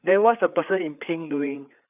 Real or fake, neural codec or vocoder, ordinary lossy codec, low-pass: fake; codec, 16 kHz, 4 kbps, FreqCodec, larger model; none; 3.6 kHz